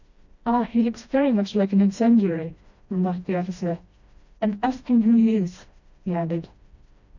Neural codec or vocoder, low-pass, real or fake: codec, 16 kHz, 1 kbps, FreqCodec, smaller model; 7.2 kHz; fake